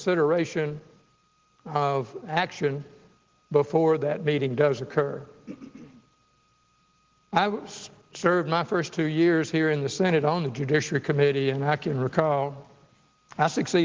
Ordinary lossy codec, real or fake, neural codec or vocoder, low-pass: Opus, 16 kbps; real; none; 7.2 kHz